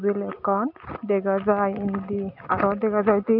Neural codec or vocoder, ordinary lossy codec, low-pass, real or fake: none; none; 5.4 kHz; real